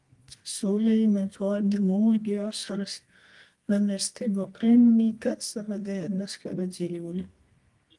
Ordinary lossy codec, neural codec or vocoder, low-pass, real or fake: Opus, 24 kbps; codec, 24 kHz, 0.9 kbps, WavTokenizer, medium music audio release; 10.8 kHz; fake